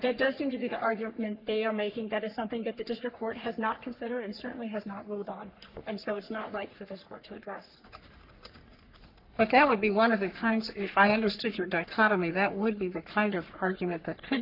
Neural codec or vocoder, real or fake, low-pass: codec, 44.1 kHz, 3.4 kbps, Pupu-Codec; fake; 5.4 kHz